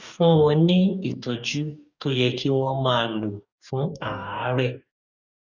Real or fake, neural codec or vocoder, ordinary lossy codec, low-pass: fake; codec, 44.1 kHz, 2.6 kbps, DAC; none; 7.2 kHz